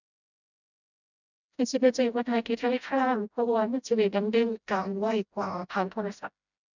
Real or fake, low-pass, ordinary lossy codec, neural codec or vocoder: fake; 7.2 kHz; none; codec, 16 kHz, 0.5 kbps, FreqCodec, smaller model